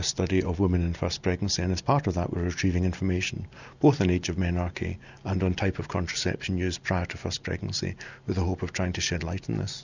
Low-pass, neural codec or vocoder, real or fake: 7.2 kHz; none; real